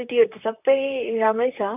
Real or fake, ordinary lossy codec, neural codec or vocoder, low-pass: fake; none; vocoder, 44.1 kHz, 128 mel bands, Pupu-Vocoder; 3.6 kHz